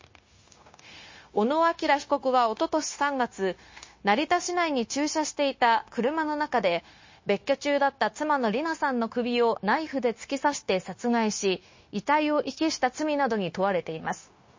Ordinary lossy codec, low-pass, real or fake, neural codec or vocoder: MP3, 32 kbps; 7.2 kHz; fake; codec, 16 kHz, 0.9 kbps, LongCat-Audio-Codec